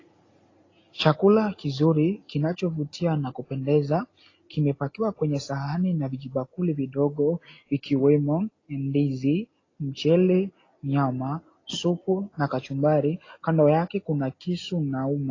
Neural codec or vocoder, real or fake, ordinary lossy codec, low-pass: none; real; AAC, 32 kbps; 7.2 kHz